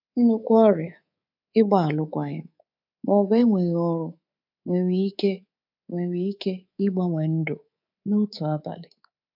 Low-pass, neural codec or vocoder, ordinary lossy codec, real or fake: 5.4 kHz; codec, 24 kHz, 3.1 kbps, DualCodec; AAC, 48 kbps; fake